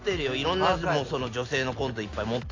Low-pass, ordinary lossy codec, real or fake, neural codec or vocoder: 7.2 kHz; AAC, 32 kbps; real; none